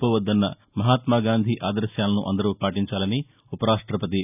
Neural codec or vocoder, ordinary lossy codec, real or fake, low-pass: none; none; real; 3.6 kHz